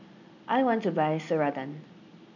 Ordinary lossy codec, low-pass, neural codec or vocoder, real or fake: none; 7.2 kHz; none; real